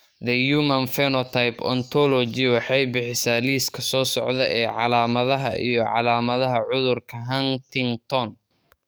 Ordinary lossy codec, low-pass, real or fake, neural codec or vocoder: none; none; fake; codec, 44.1 kHz, 7.8 kbps, DAC